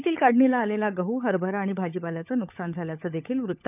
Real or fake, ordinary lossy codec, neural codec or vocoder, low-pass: fake; none; codec, 16 kHz, 16 kbps, FunCodec, trained on LibriTTS, 50 frames a second; 3.6 kHz